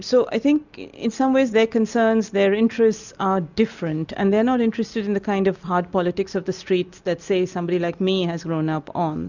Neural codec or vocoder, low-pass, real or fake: none; 7.2 kHz; real